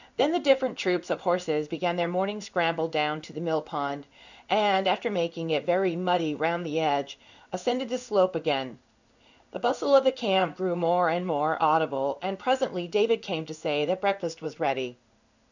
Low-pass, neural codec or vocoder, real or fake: 7.2 kHz; codec, 16 kHz in and 24 kHz out, 1 kbps, XY-Tokenizer; fake